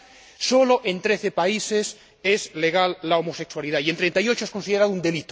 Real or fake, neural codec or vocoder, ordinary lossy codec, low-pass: real; none; none; none